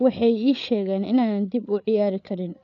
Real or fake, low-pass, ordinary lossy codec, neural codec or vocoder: fake; 7.2 kHz; none; codec, 16 kHz, 8 kbps, FreqCodec, larger model